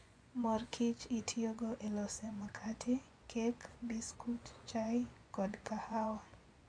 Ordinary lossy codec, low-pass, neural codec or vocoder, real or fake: none; 9.9 kHz; vocoder, 24 kHz, 100 mel bands, Vocos; fake